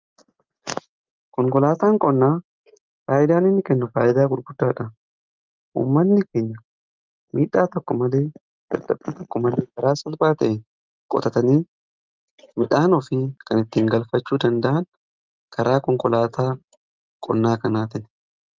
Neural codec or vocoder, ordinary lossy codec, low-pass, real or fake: none; Opus, 32 kbps; 7.2 kHz; real